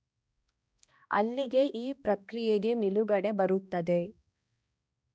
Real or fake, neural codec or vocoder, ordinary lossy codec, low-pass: fake; codec, 16 kHz, 1 kbps, X-Codec, HuBERT features, trained on balanced general audio; none; none